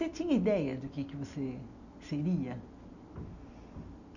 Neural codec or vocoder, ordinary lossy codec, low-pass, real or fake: none; none; 7.2 kHz; real